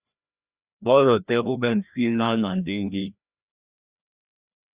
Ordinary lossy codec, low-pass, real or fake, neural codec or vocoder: Opus, 64 kbps; 3.6 kHz; fake; codec, 16 kHz, 1 kbps, FreqCodec, larger model